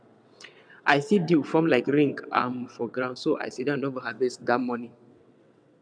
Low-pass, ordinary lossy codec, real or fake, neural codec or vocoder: 9.9 kHz; none; fake; vocoder, 22.05 kHz, 80 mel bands, WaveNeXt